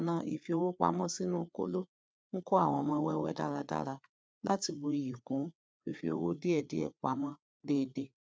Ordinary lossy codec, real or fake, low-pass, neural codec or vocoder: none; fake; none; codec, 16 kHz, 4 kbps, FreqCodec, larger model